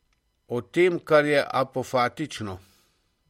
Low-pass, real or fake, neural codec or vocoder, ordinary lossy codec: 19.8 kHz; real; none; MP3, 64 kbps